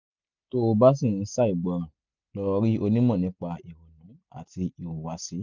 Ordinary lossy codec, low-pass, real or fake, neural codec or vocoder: none; 7.2 kHz; real; none